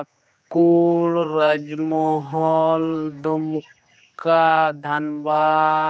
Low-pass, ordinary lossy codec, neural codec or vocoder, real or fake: none; none; codec, 16 kHz, 2 kbps, X-Codec, HuBERT features, trained on general audio; fake